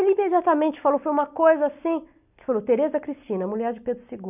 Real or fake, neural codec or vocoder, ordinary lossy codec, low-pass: real; none; none; 3.6 kHz